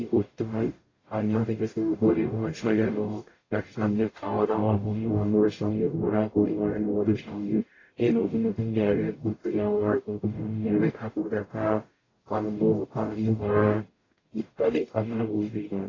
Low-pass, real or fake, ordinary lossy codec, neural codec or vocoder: 7.2 kHz; fake; AAC, 32 kbps; codec, 44.1 kHz, 0.9 kbps, DAC